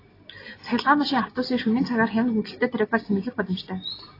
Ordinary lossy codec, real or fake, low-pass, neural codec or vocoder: AAC, 24 kbps; real; 5.4 kHz; none